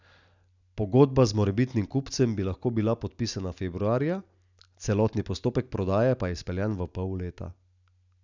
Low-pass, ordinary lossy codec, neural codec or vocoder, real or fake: 7.2 kHz; none; none; real